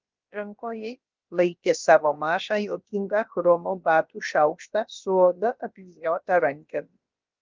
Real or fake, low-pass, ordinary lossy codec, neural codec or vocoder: fake; 7.2 kHz; Opus, 24 kbps; codec, 16 kHz, about 1 kbps, DyCAST, with the encoder's durations